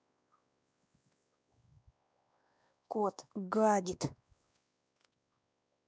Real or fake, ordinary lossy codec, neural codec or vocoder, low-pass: fake; none; codec, 16 kHz, 2 kbps, X-Codec, WavLM features, trained on Multilingual LibriSpeech; none